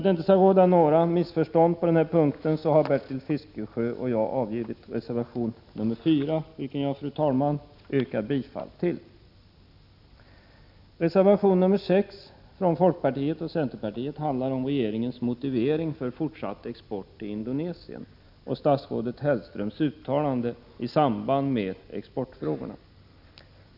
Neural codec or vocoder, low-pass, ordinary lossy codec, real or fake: none; 5.4 kHz; none; real